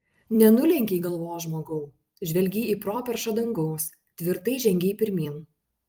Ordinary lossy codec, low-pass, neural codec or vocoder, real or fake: Opus, 32 kbps; 19.8 kHz; vocoder, 44.1 kHz, 128 mel bands every 256 samples, BigVGAN v2; fake